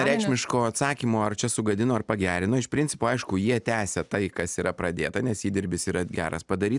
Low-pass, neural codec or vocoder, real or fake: 10.8 kHz; none; real